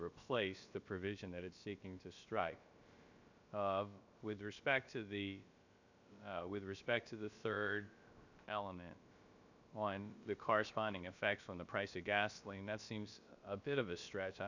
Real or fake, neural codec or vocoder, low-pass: fake; codec, 16 kHz, about 1 kbps, DyCAST, with the encoder's durations; 7.2 kHz